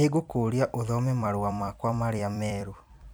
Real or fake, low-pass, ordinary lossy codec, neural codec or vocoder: fake; none; none; vocoder, 44.1 kHz, 128 mel bands every 256 samples, BigVGAN v2